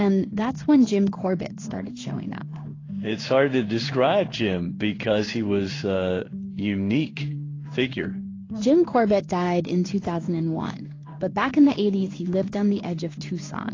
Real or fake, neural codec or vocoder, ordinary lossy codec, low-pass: fake; codec, 16 kHz in and 24 kHz out, 1 kbps, XY-Tokenizer; AAC, 32 kbps; 7.2 kHz